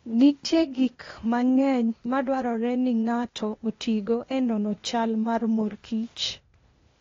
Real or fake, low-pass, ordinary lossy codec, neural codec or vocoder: fake; 7.2 kHz; AAC, 32 kbps; codec, 16 kHz, 0.8 kbps, ZipCodec